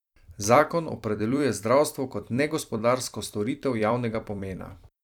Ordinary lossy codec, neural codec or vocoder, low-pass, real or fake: none; vocoder, 44.1 kHz, 128 mel bands every 256 samples, BigVGAN v2; 19.8 kHz; fake